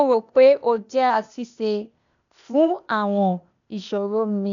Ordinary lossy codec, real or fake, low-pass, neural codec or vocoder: none; fake; 7.2 kHz; codec, 16 kHz, 0.8 kbps, ZipCodec